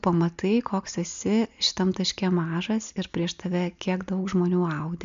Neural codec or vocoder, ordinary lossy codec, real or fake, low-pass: none; MP3, 64 kbps; real; 7.2 kHz